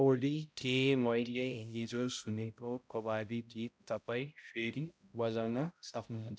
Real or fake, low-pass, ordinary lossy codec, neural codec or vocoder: fake; none; none; codec, 16 kHz, 0.5 kbps, X-Codec, HuBERT features, trained on balanced general audio